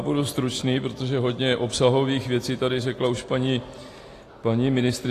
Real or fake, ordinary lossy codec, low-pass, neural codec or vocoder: real; AAC, 48 kbps; 14.4 kHz; none